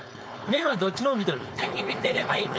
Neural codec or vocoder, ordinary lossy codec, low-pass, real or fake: codec, 16 kHz, 4.8 kbps, FACodec; none; none; fake